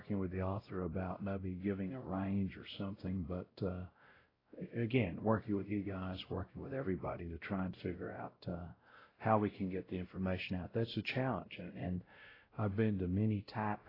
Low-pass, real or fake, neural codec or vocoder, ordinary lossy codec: 5.4 kHz; fake; codec, 16 kHz, 0.5 kbps, X-Codec, WavLM features, trained on Multilingual LibriSpeech; AAC, 24 kbps